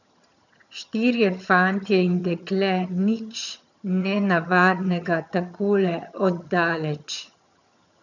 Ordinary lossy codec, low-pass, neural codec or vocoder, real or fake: none; 7.2 kHz; vocoder, 22.05 kHz, 80 mel bands, HiFi-GAN; fake